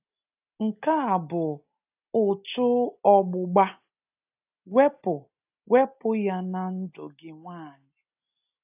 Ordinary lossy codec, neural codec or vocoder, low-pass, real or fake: AAC, 32 kbps; none; 3.6 kHz; real